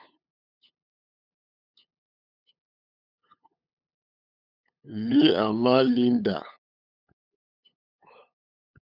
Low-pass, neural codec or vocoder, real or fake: 5.4 kHz; codec, 16 kHz, 8 kbps, FunCodec, trained on LibriTTS, 25 frames a second; fake